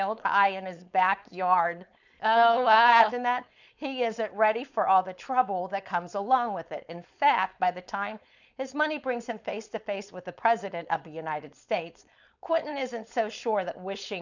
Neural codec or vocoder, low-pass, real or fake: codec, 16 kHz, 4.8 kbps, FACodec; 7.2 kHz; fake